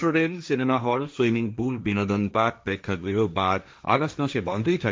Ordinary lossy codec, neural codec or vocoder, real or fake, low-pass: none; codec, 16 kHz, 1.1 kbps, Voila-Tokenizer; fake; none